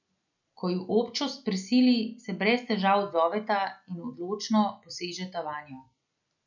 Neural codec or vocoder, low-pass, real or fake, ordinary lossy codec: none; 7.2 kHz; real; none